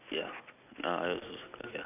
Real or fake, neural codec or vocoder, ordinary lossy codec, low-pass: real; none; none; 3.6 kHz